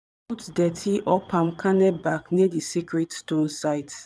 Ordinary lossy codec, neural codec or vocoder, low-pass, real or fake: none; vocoder, 22.05 kHz, 80 mel bands, Vocos; none; fake